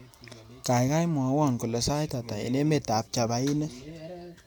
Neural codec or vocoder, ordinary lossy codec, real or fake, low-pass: none; none; real; none